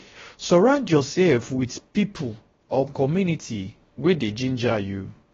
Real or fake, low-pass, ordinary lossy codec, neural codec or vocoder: fake; 7.2 kHz; AAC, 24 kbps; codec, 16 kHz, about 1 kbps, DyCAST, with the encoder's durations